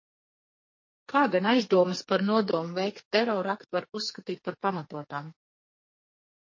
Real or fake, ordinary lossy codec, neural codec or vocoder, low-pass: fake; MP3, 32 kbps; codec, 44.1 kHz, 2.6 kbps, SNAC; 7.2 kHz